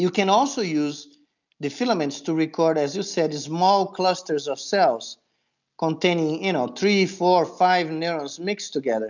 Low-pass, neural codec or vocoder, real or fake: 7.2 kHz; none; real